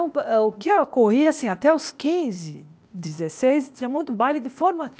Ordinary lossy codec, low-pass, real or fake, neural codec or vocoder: none; none; fake; codec, 16 kHz, 0.8 kbps, ZipCodec